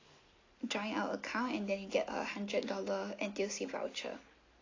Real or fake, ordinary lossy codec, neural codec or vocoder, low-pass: real; AAC, 32 kbps; none; 7.2 kHz